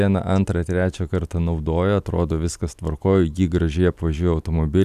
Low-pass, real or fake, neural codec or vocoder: 14.4 kHz; real; none